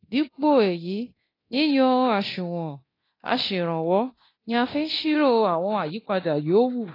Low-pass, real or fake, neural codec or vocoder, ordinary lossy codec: 5.4 kHz; fake; codec, 24 kHz, 0.9 kbps, DualCodec; AAC, 24 kbps